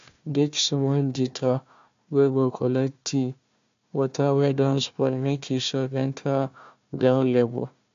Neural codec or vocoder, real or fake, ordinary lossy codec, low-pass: codec, 16 kHz, 1 kbps, FunCodec, trained on Chinese and English, 50 frames a second; fake; AAC, 64 kbps; 7.2 kHz